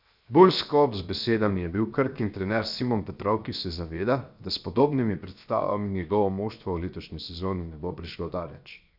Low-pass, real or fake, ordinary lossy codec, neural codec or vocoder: 5.4 kHz; fake; none; codec, 16 kHz, 0.7 kbps, FocalCodec